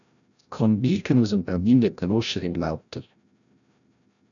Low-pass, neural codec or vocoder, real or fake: 7.2 kHz; codec, 16 kHz, 0.5 kbps, FreqCodec, larger model; fake